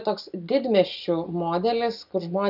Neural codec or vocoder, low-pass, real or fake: none; 5.4 kHz; real